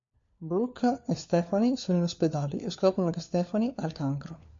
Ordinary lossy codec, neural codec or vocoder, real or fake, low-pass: MP3, 48 kbps; codec, 16 kHz, 4 kbps, FunCodec, trained on LibriTTS, 50 frames a second; fake; 7.2 kHz